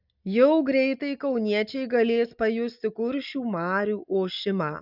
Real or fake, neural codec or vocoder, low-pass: real; none; 5.4 kHz